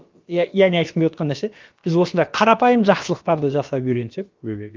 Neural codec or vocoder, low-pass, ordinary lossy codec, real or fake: codec, 16 kHz, about 1 kbps, DyCAST, with the encoder's durations; 7.2 kHz; Opus, 32 kbps; fake